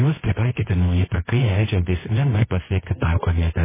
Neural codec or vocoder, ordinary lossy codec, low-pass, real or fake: codec, 24 kHz, 0.9 kbps, WavTokenizer, medium music audio release; MP3, 16 kbps; 3.6 kHz; fake